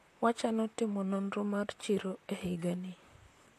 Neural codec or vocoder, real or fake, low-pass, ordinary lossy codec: vocoder, 44.1 kHz, 128 mel bands, Pupu-Vocoder; fake; 14.4 kHz; AAC, 64 kbps